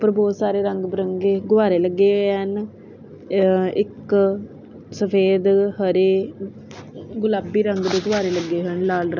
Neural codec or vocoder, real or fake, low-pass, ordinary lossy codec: none; real; 7.2 kHz; none